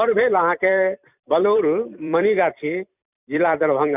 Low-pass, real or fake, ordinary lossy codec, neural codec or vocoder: 3.6 kHz; fake; none; vocoder, 44.1 kHz, 128 mel bands every 256 samples, BigVGAN v2